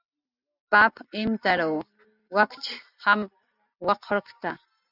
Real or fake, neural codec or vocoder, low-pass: real; none; 5.4 kHz